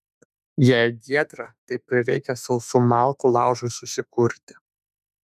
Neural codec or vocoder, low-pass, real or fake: autoencoder, 48 kHz, 32 numbers a frame, DAC-VAE, trained on Japanese speech; 14.4 kHz; fake